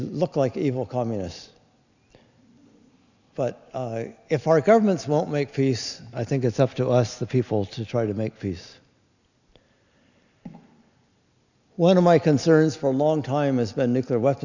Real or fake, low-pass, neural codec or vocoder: real; 7.2 kHz; none